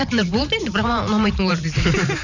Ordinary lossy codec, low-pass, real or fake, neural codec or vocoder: none; 7.2 kHz; fake; vocoder, 44.1 kHz, 128 mel bands every 512 samples, BigVGAN v2